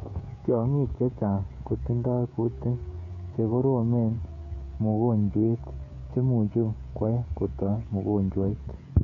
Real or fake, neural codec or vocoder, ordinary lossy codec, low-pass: fake; codec, 16 kHz, 16 kbps, FreqCodec, smaller model; none; 7.2 kHz